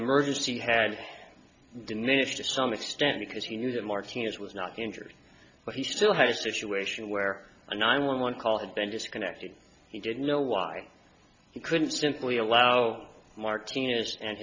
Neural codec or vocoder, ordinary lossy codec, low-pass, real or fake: none; MP3, 64 kbps; 7.2 kHz; real